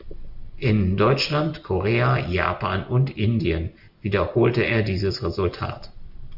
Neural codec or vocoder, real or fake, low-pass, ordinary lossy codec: none; real; 5.4 kHz; AAC, 48 kbps